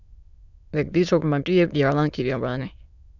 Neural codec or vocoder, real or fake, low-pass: autoencoder, 22.05 kHz, a latent of 192 numbers a frame, VITS, trained on many speakers; fake; 7.2 kHz